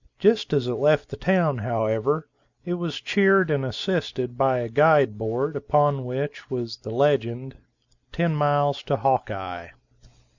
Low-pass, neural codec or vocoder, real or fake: 7.2 kHz; none; real